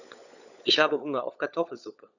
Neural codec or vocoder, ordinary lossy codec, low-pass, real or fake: codec, 16 kHz, 16 kbps, FunCodec, trained on LibriTTS, 50 frames a second; none; 7.2 kHz; fake